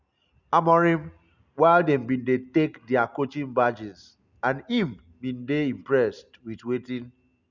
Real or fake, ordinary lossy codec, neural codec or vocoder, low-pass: real; none; none; 7.2 kHz